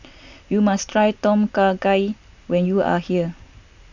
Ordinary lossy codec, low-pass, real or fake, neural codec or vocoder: none; 7.2 kHz; real; none